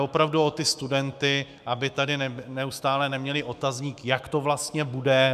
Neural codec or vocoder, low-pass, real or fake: autoencoder, 48 kHz, 128 numbers a frame, DAC-VAE, trained on Japanese speech; 14.4 kHz; fake